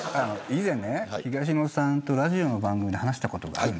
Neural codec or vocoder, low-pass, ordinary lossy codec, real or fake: none; none; none; real